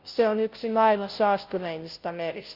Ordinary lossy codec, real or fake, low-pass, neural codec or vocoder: Opus, 32 kbps; fake; 5.4 kHz; codec, 16 kHz, 0.5 kbps, FunCodec, trained on Chinese and English, 25 frames a second